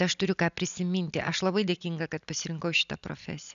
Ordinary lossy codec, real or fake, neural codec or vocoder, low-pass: MP3, 96 kbps; real; none; 7.2 kHz